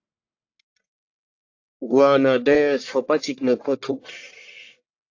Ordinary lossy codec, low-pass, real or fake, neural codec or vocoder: AAC, 48 kbps; 7.2 kHz; fake; codec, 44.1 kHz, 1.7 kbps, Pupu-Codec